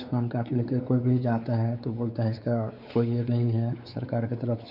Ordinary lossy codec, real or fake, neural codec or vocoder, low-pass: none; fake; codec, 16 kHz, 4 kbps, X-Codec, WavLM features, trained on Multilingual LibriSpeech; 5.4 kHz